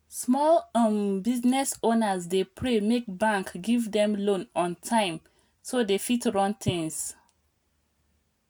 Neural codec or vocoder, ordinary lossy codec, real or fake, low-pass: none; none; real; none